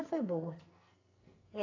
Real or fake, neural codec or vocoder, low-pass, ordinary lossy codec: fake; codec, 44.1 kHz, 2.6 kbps, SNAC; 7.2 kHz; AAC, 32 kbps